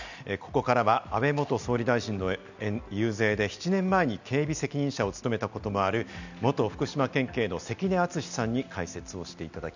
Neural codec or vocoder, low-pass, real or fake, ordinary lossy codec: none; 7.2 kHz; real; none